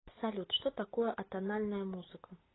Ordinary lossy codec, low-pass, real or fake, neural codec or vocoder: AAC, 16 kbps; 7.2 kHz; real; none